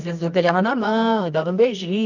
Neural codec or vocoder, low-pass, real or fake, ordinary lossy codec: codec, 24 kHz, 0.9 kbps, WavTokenizer, medium music audio release; 7.2 kHz; fake; none